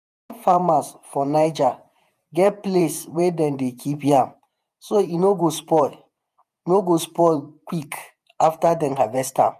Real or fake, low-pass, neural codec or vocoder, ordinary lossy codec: fake; 14.4 kHz; vocoder, 48 kHz, 128 mel bands, Vocos; none